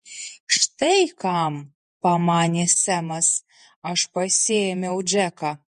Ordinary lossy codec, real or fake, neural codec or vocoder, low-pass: MP3, 48 kbps; fake; vocoder, 48 kHz, 128 mel bands, Vocos; 14.4 kHz